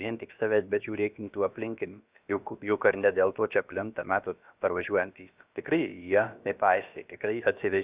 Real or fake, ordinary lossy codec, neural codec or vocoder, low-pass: fake; Opus, 64 kbps; codec, 16 kHz, about 1 kbps, DyCAST, with the encoder's durations; 3.6 kHz